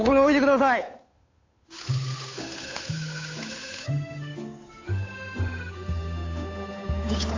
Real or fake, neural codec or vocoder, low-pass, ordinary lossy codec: fake; codec, 16 kHz, 8 kbps, FunCodec, trained on Chinese and English, 25 frames a second; 7.2 kHz; AAC, 32 kbps